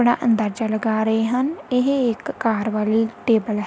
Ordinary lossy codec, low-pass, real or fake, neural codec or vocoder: none; none; real; none